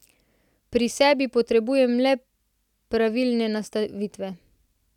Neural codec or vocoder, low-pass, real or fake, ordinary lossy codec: none; 19.8 kHz; real; none